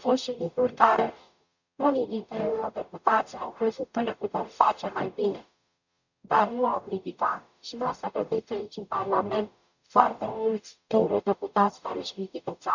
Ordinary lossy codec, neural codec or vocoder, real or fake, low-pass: none; codec, 44.1 kHz, 0.9 kbps, DAC; fake; 7.2 kHz